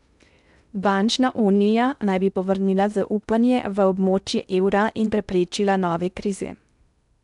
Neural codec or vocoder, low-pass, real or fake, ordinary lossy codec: codec, 16 kHz in and 24 kHz out, 0.6 kbps, FocalCodec, streaming, 2048 codes; 10.8 kHz; fake; none